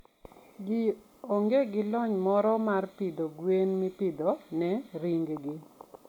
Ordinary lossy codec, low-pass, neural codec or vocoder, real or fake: none; 19.8 kHz; none; real